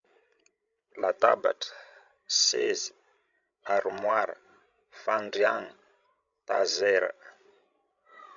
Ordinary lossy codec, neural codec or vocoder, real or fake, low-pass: MP3, 64 kbps; codec, 16 kHz, 16 kbps, FreqCodec, larger model; fake; 7.2 kHz